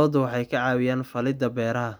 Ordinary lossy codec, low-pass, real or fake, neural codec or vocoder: none; none; real; none